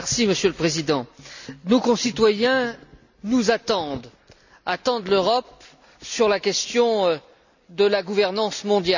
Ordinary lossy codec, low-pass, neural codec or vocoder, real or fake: none; 7.2 kHz; none; real